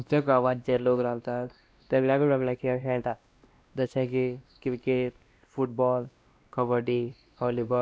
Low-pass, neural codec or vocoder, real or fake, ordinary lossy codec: none; codec, 16 kHz, 1 kbps, X-Codec, WavLM features, trained on Multilingual LibriSpeech; fake; none